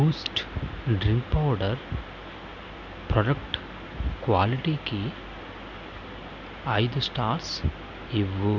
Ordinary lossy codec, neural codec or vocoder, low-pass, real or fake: none; none; 7.2 kHz; real